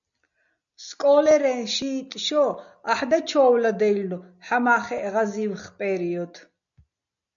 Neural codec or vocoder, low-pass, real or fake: none; 7.2 kHz; real